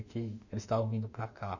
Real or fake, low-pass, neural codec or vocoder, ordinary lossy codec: fake; 7.2 kHz; autoencoder, 48 kHz, 32 numbers a frame, DAC-VAE, trained on Japanese speech; none